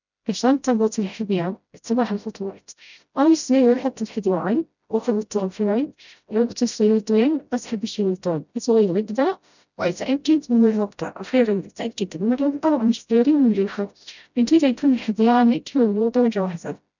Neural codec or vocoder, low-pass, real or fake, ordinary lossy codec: codec, 16 kHz, 0.5 kbps, FreqCodec, smaller model; 7.2 kHz; fake; none